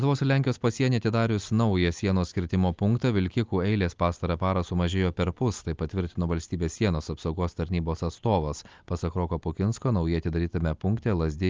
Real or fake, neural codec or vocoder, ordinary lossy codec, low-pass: real; none; Opus, 24 kbps; 7.2 kHz